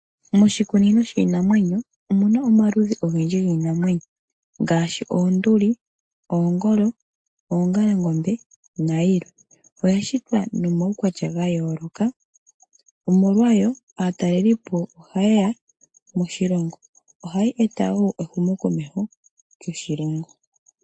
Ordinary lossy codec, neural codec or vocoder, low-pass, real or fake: AAC, 64 kbps; none; 9.9 kHz; real